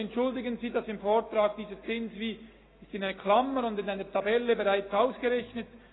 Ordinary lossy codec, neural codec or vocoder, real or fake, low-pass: AAC, 16 kbps; none; real; 7.2 kHz